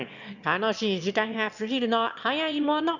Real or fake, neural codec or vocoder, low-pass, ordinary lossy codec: fake; autoencoder, 22.05 kHz, a latent of 192 numbers a frame, VITS, trained on one speaker; 7.2 kHz; none